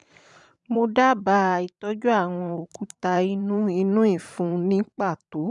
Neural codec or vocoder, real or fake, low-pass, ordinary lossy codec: vocoder, 44.1 kHz, 128 mel bands every 512 samples, BigVGAN v2; fake; 10.8 kHz; none